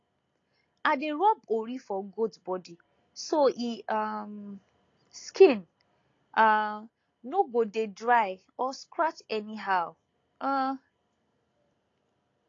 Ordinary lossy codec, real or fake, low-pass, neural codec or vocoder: AAC, 32 kbps; real; 7.2 kHz; none